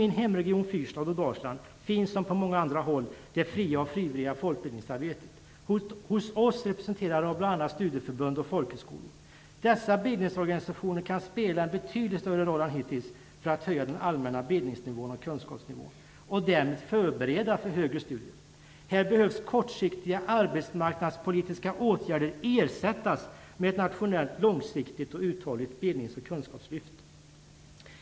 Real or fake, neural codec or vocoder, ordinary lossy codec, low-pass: real; none; none; none